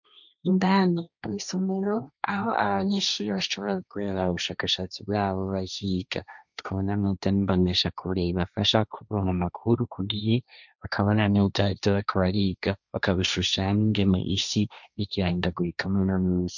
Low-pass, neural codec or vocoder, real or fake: 7.2 kHz; codec, 16 kHz, 1.1 kbps, Voila-Tokenizer; fake